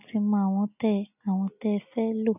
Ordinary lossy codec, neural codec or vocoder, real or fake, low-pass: none; none; real; 3.6 kHz